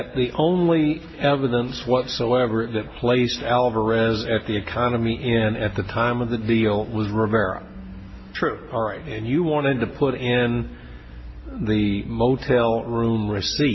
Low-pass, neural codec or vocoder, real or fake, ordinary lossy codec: 7.2 kHz; none; real; MP3, 24 kbps